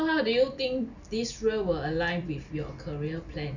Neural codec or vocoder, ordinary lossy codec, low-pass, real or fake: none; none; 7.2 kHz; real